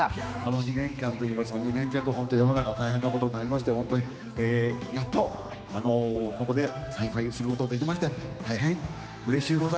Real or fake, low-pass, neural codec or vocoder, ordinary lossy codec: fake; none; codec, 16 kHz, 2 kbps, X-Codec, HuBERT features, trained on general audio; none